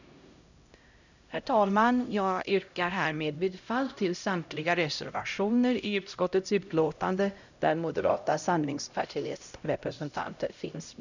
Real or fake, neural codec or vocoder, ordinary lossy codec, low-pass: fake; codec, 16 kHz, 0.5 kbps, X-Codec, HuBERT features, trained on LibriSpeech; none; 7.2 kHz